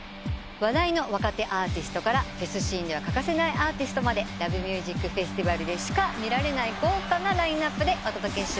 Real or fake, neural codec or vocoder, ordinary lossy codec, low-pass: real; none; none; none